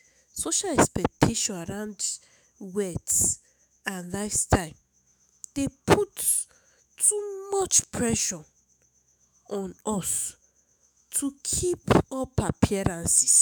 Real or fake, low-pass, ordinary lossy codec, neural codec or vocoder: fake; none; none; autoencoder, 48 kHz, 128 numbers a frame, DAC-VAE, trained on Japanese speech